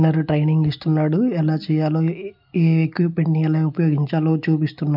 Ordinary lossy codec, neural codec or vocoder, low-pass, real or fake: none; none; 5.4 kHz; real